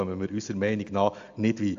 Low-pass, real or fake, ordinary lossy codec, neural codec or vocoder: 7.2 kHz; real; AAC, 64 kbps; none